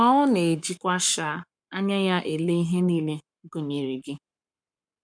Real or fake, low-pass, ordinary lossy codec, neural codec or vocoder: fake; 9.9 kHz; none; codec, 44.1 kHz, 7.8 kbps, Pupu-Codec